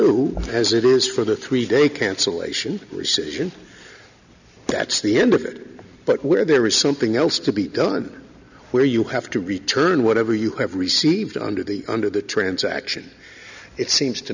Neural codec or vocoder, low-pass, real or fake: none; 7.2 kHz; real